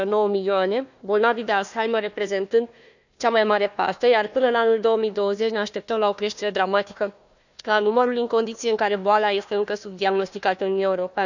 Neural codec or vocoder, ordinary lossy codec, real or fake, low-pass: codec, 16 kHz, 1 kbps, FunCodec, trained on Chinese and English, 50 frames a second; none; fake; 7.2 kHz